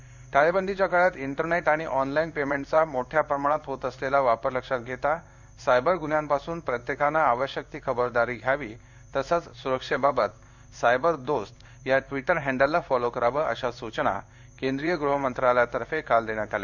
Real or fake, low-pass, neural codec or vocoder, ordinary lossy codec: fake; 7.2 kHz; codec, 16 kHz in and 24 kHz out, 1 kbps, XY-Tokenizer; none